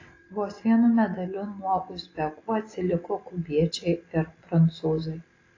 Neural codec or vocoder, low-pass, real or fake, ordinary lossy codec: none; 7.2 kHz; real; AAC, 32 kbps